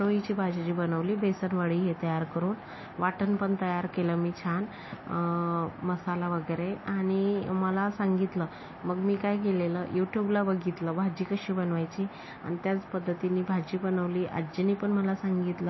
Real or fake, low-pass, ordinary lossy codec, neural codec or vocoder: real; 7.2 kHz; MP3, 24 kbps; none